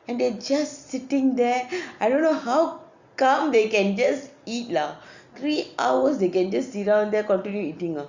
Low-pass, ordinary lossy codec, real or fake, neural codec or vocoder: 7.2 kHz; Opus, 64 kbps; real; none